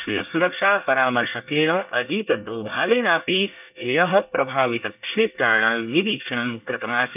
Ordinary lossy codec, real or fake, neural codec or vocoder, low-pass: none; fake; codec, 24 kHz, 1 kbps, SNAC; 3.6 kHz